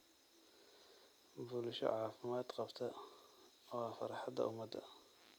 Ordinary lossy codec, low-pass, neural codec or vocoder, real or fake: none; none; none; real